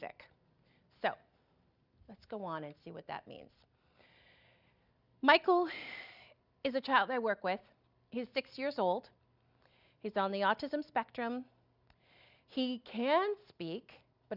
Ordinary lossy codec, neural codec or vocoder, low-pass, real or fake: Opus, 64 kbps; none; 5.4 kHz; real